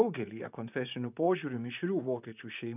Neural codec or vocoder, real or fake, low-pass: vocoder, 44.1 kHz, 128 mel bands, Pupu-Vocoder; fake; 3.6 kHz